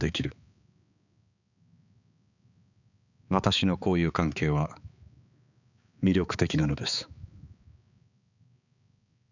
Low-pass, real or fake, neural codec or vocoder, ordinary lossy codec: 7.2 kHz; fake; codec, 16 kHz, 4 kbps, X-Codec, HuBERT features, trained on balanced general audio; none